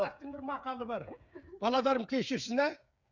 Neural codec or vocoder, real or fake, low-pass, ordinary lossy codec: codec, 16 kHz, 8 kbps, FreqCodec, smaller model; fake; 7.2 kHz; none